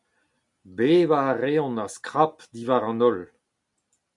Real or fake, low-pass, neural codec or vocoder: real; 10.8 kHz; none